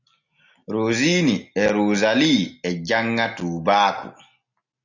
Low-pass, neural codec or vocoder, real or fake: 7.2 kHz; none; real